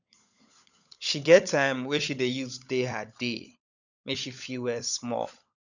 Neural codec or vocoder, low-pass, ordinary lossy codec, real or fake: codec, 16 kHz, 16 kbps, FunCodec, trained on LibriTTS, 50 frames a second; 7.2 kHz; none; fake